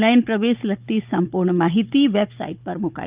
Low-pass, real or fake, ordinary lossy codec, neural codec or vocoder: 3.6 kHz; fake; Opus, 24 kbps; codec, 16 kHz, 16 kbps, FunCodec, trained on Chinese and English, 50 frames a second